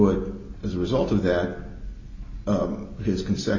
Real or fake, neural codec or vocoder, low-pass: real; none; 7.2 kHz